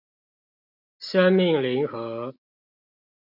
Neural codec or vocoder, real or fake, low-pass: none; real; 5.4 kHz